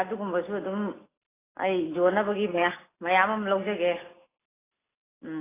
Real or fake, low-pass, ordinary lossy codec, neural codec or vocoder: real; 3.6 kHz; AAC, 16 kbps; none